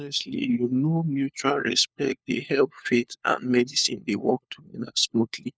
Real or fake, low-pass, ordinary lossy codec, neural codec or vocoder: fake; none; none; codec, 16 kHz, 4 kbps, FunCodec, trained on LibriTTS, 50 frames a second